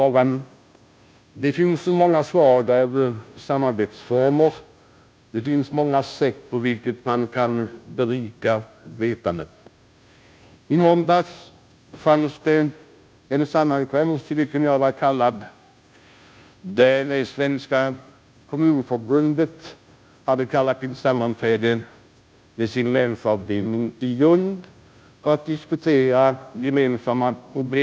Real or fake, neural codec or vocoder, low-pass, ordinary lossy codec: fake; codec, 16 kHz, 0.5 kbps, FunCodec, trained on Chinese and English, 25 frames a second; none; none